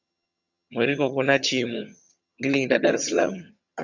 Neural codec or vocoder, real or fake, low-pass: vocoder, 22.05 kHz, 80 mel bands, HiFi-GAN; fake; 7.2 kHz